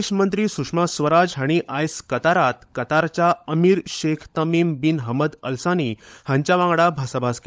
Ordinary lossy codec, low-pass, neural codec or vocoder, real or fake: none; none; codec, 16 kHz, 16 kbps, FunCodec, trained on LibriTTS, 50 frames a second; fake